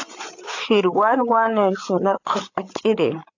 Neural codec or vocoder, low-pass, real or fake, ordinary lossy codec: codec, 16 kHz, 8 kbps, FreqCodec, larger model; 7.2 kHz; fake; AAC, 48 kbps